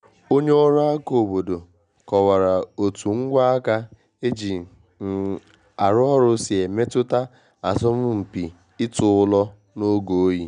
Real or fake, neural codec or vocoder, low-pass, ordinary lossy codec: real; none; 9.9 kHz; none